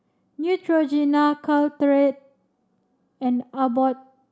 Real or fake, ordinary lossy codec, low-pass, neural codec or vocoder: real; none; none; none